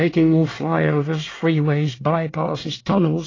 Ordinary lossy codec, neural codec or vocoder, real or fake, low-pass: AAC, 32 kbps; codec, 24 kHz, 1 kbps, SNAC; fake; 7.2 kHz